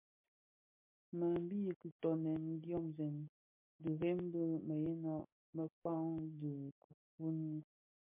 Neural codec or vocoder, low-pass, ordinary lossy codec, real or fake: none; 3.6 kHz; MP3, 32 kbps; real